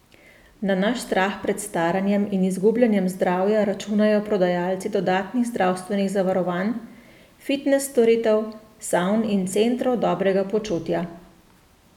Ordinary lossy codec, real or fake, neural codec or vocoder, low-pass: none; real; none; 19.8 kHz